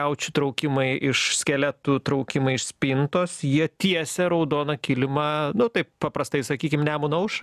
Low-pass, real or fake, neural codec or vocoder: 14.4 kHz; real; none